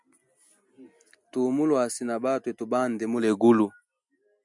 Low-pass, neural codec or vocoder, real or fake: 10.8 kHz; none; real